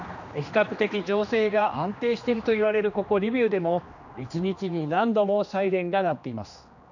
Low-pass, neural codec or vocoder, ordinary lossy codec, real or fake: 7.2 kHz; codec, 16 kHz, 2 kbps, X-Codec, HuBERT features, trained on general audio; none; fake